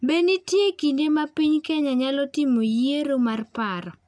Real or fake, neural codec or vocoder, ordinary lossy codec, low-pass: real; none; none; 9.9 kHz